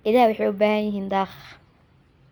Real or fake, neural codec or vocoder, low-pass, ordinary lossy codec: real; none; 19.8 kHz; none